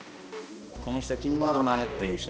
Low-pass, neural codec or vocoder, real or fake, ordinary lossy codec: none; codec, 16 kHz, 1 kbps, X-Codec, HuBERT features, trained on balanced general audio; fake; none